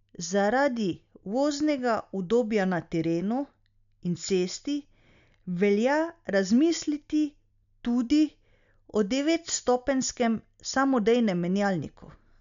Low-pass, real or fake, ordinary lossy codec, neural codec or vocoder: 7.2 kHz; real; none; none